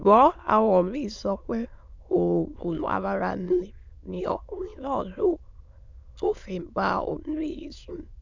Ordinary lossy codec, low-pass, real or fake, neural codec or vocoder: MP3, 64 kbps; 7.2 kHz; fake; autoencoder, 22.05 kHz, a latent of 192 numbers a frame, VITS, trained on many speakers